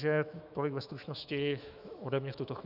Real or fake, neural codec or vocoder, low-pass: fake; autoencoder, 48 kHz, 128 numbers a frame, DAC-VAE, trained on Japanese speech; 5.4 kHz